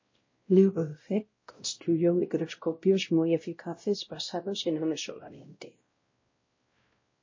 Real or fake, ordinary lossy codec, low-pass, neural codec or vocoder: fake; MP3, 32 kbps; 7.2 kHz; codec, 16 kHz, 0.5 kbps, X-Codec, WavLM features, trained on Multilingual LibriSpeech